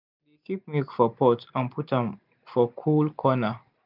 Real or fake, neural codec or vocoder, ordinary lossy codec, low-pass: real; none; none; 5.4 kHz